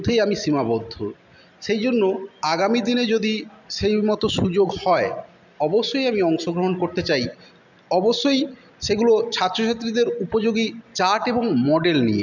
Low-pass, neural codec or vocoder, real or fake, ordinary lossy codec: 7.2 kHz; none; real; none